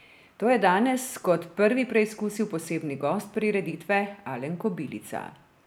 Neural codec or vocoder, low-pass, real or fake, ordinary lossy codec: none; none; real; none